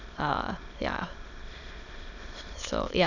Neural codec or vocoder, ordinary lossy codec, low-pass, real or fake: autoencoder, 22.05 kHz, a latent of 192 numbers a frame, VITS, trained on many speakers; none; 7.2 kHz; fake